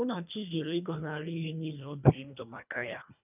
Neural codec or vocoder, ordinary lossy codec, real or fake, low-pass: codec, 24 kHz, 1.5 kbps, HILCodec; none; fake; 3.6 kHz